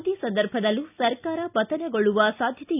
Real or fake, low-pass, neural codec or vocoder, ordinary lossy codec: real; 3.6 kHz; none; none